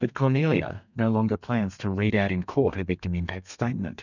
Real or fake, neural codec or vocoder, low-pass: fake; codec, 32 kHz, 1.9 kbps, SNAC; 7.2 kHz